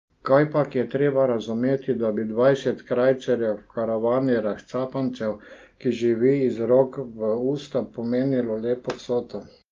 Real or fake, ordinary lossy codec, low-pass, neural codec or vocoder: real; Opus, 32 kbps; 7.2 kHz; none